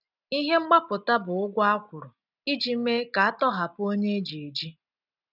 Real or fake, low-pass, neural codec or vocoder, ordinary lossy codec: real; 5.4 kHz; none; none